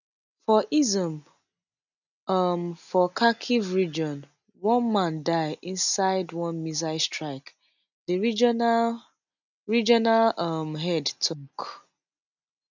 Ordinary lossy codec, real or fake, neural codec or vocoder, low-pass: none; real; none; 7.2 kHz